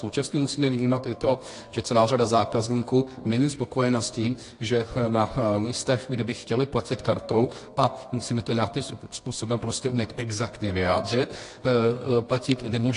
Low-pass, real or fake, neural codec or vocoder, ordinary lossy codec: 10.8 kHz; fake; codec, 24 kHz, 0.9 kbps, WavTokenizer, medium music audio release; AAC, 48 kbps